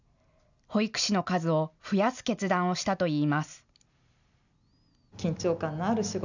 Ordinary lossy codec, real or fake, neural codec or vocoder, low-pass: none; real; none; 7.2 kHz